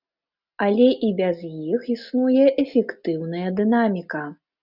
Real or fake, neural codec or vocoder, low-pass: real; none; 5.4 kHz